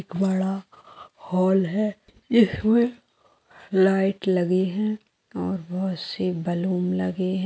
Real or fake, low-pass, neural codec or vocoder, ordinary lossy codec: real; none; none; none